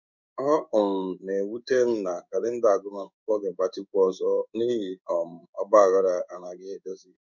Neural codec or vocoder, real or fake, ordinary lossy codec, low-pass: codec, 16 kHz in and 24 kHz out, 1 kbps, XY-Tokenizer; fake; none; 7.2 kHz